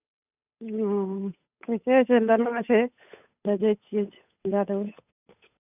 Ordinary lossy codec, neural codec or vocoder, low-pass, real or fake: none; codec, 16 kHz, 8 kbps, FunCodec, trained on Chinese and English, 25 frames a second; 3.6 kHz; fake